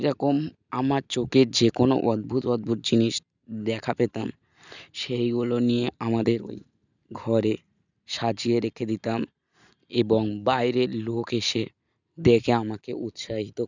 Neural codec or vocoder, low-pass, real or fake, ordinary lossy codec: none; 7.2 kHz; real; none